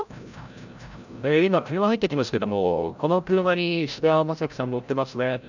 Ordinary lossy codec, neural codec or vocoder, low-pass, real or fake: none; codec, 16 kHz, 0.5 kbps, FreqCodec, larger model; 7.2 kHz; fake